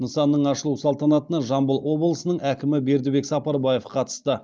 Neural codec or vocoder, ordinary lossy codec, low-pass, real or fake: none; Opus, 32 kbps; 7.2 kHz; real